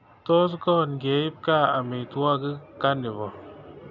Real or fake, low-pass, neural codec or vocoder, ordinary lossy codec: real; 7.2 kHz; none; none